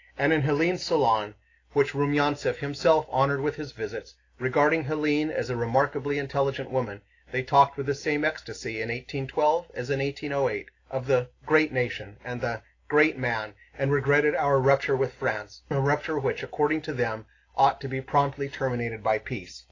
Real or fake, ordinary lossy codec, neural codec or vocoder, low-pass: real; AAC, 32 kbps; none; 7.2 kHz